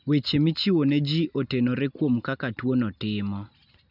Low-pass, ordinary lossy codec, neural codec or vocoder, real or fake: 5.4 kHz; none; none; real